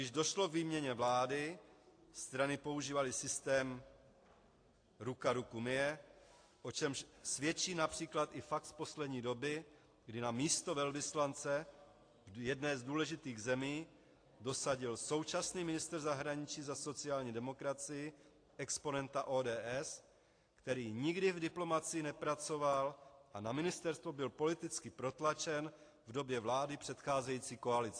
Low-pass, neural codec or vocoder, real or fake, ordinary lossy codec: 9.9 kHz; none; real; AAC, 48 kbps